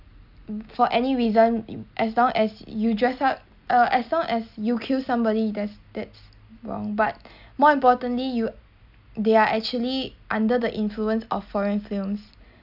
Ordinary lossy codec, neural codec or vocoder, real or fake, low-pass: none; none; real; 5.4 kHz